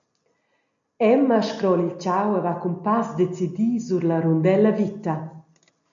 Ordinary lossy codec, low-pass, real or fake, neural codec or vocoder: MP3, 96 kbps; 7.2 kHz; real; none